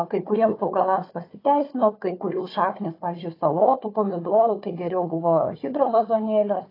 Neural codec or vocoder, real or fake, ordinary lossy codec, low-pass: codec, 16 kHz, 4 kbps, FunCodec, trained on Chinese and English, 50 frames a second; fake; AAC, 24 kbps; 5.4 kHz